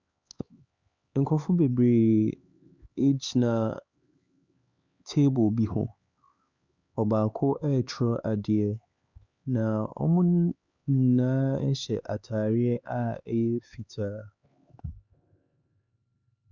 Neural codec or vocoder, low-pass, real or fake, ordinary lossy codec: codec, 16 kHz, 4 kbps, X-Codec, HuBERT features, trained on LibriSpeech; 7.2 kHz; fake; Opus, 64 kbps